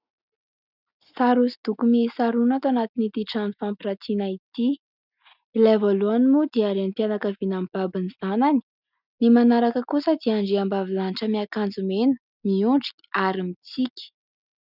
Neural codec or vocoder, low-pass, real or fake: none; 5.4 kHz; real